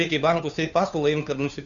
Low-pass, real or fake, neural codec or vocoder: 7.2 kHz; fake; codec, 16 kHz, 2 kbps, FunCodec, trained on Chinese and English, 25 frames a second